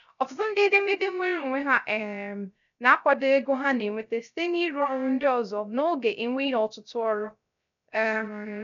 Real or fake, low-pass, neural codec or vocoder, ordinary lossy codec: fake; 7.2 kHz; codec, 16 kHz, 0.3 kbps, FocalCodec; none